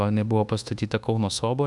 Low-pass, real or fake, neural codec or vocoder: 10.8 kHz; fake; codec, 24 kHz, 1.2 kbps, DualCodec